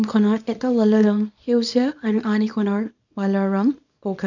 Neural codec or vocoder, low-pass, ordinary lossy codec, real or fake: codec, 24 kHz, 0.9 kbps, WavTokenizer, small release; 7.2 kHz; none; fake